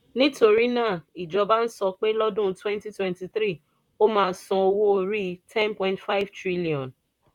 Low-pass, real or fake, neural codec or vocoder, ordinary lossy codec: 19.8 kHz; fake; vocoder, 44.1 kHz, 128 mel bands, Pupu-Vocoder; none